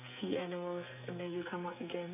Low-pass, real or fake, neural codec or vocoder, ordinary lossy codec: 3.6 kHz; fake; codec, 44.1 kHz, 2.6 kbps, SNAC; none